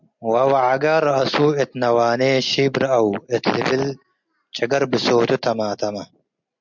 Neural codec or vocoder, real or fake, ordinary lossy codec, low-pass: none; real; MP3, 64 kbps; 7.2 kHz